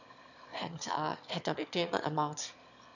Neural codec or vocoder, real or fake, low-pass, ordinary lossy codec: autoencoder, 22.05 kHz, a latent of 192 numbers a frame, VITS, trained on one speaker; fake; 7.2 kHz; none